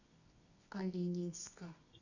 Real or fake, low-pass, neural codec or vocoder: fake; 7.2 kHz; codec, 24 kHz, 0.9 kbps, WavTokenizer, medium music audio release